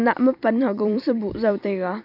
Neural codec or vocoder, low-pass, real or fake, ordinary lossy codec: vocoder, 44.1 kHz, 128 mel bands every 256 samples, BigVGAN v2; 5.4 kHz; fake; none